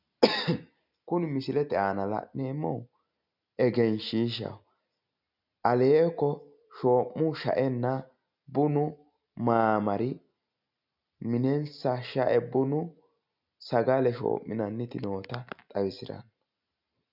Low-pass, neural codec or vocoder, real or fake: 5.4 kHz; none; real